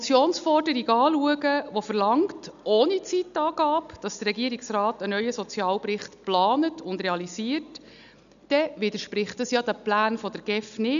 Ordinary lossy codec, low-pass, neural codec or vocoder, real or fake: none; 7.2 kHz; none; real